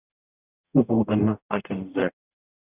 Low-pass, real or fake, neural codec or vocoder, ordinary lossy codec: 3.6 kHz; fake; codec, 44.1 kHz, 0.9 kbps, DAC; Opus, 64 kbps